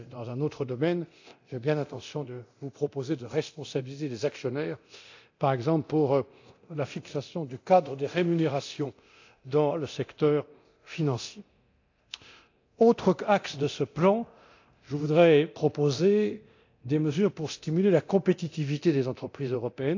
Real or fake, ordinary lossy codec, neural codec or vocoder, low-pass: fake; AAC, 48 kbps; codec, 24 kHz, 0.9 kbps, DualCodec; 7.2 kHz